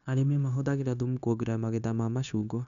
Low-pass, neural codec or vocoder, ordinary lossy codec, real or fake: 7.2 kHz; codec, 16 kHz, 0.9 kbps, LongCat-Audio-Codec; none; fake